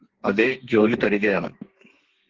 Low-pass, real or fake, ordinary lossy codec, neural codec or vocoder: 7.2 kHz; fake; Opus, 16 kbps; codec, 32 kHz, 1.9 kbps, SNAC